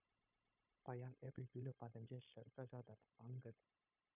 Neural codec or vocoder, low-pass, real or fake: codec, 16 kHz, 0.9 kbps, LongCat-Audio-Codec; 3.6 kHz; fake